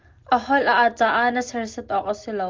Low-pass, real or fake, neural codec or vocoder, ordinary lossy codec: 7.2 kHz; real; none; Opus, 32 kbps